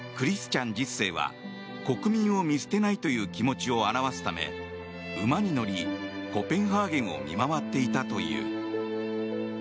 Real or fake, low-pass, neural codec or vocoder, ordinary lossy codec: real; none; none; none